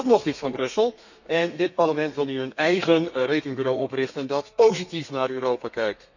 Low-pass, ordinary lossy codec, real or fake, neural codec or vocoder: 7.2 kHz; none; fake; codec, 32 kHz, 1.9 kbps, SNAC